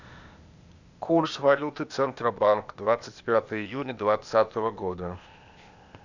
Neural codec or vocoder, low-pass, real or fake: codec, 16 kHz, 0.8 kbps, ZipCodec; 7.2 kHz; fake